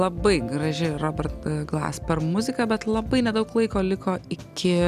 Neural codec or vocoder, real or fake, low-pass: none; real; 14.4 kHz